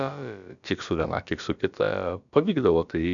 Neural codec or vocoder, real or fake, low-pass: codec, 16 kHz, about 1 kbps, DyCAST, with the encoder's durations; fake; 7.2 kHz